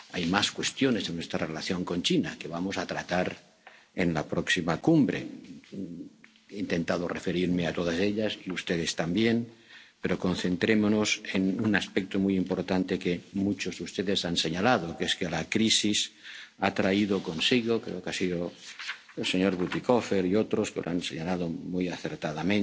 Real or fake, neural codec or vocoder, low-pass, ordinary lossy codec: real; none; none; none